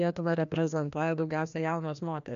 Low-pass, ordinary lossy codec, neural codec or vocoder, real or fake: 7.2 kHz; AAC, 96 kbps; codec, 16 kHz, 1 kbps, FreqCodec, larger model; fake